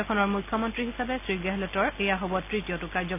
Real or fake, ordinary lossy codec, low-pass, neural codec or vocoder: real; none; 3.6 kHz; none